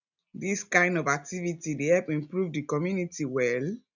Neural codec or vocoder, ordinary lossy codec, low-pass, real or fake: none; none; 7.2 kHz; real